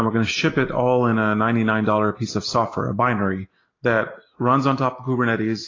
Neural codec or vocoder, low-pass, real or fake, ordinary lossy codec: none; 7.2 kHz; real; AAC, 32 kbps